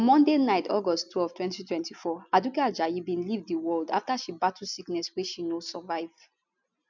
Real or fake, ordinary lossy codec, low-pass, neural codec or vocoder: real; none; 7.2 kHz; none